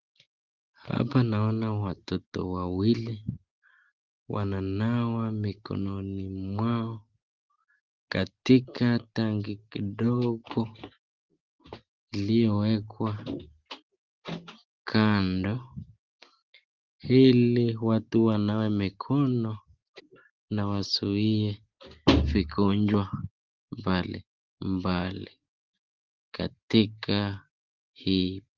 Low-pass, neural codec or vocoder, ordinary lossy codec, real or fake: 7.2 kHz; none; Opus, 16 kbps; real